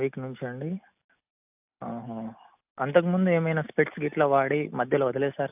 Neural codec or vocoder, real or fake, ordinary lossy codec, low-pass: none; real; AAC, 32 kbps; 3.6 kHz